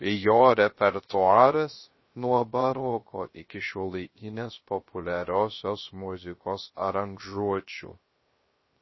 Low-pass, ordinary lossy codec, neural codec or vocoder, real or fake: 7.2 kHz; MP3, 24 kbps; codec, 16 kHz, 0.3 kbps, FocalCodec; fake